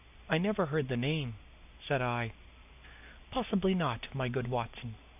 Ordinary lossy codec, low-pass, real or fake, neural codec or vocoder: AAC, 32 kbps; 3.6 kHz; real; none